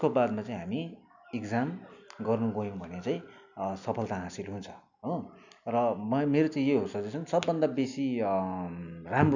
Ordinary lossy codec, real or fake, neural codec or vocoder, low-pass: none; real; none; 7.2 kHz